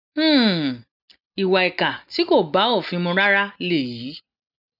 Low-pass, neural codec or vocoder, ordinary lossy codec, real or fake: 5.4 kHz; none; none; real